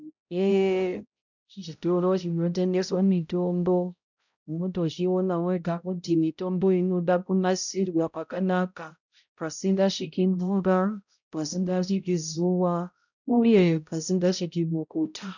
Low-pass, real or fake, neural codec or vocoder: 7.2 kHz; fake; codec, 16 kHz, 0.5 kbps, X-Codec, HuBERT features, trained on balanced general audio